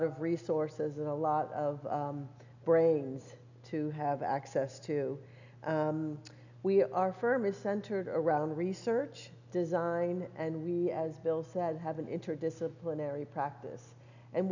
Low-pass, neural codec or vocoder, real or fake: 7.2 kHz; none; real